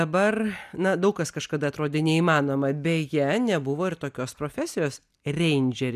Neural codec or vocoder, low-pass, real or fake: none; 14.4 kHz; real